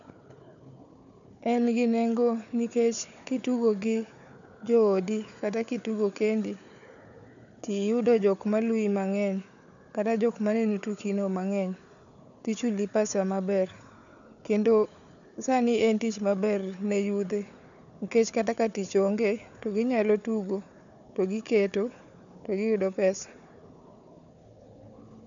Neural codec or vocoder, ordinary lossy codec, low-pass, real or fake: codec, 16 kHz, 4 kbps, FunCodec, trained on Chinese and English, 50 frames a second; none; 7.2 kHz; fake